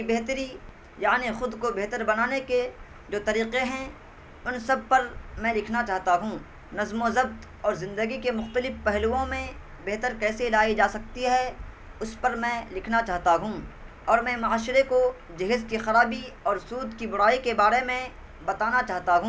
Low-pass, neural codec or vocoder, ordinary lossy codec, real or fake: none; none; none; real